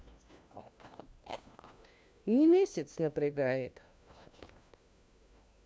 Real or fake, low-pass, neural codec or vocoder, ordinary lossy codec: fake; none; codec, 16 kHz, 1 kbps, FunCodec, trained on LibriTTS, 50 frames a second; none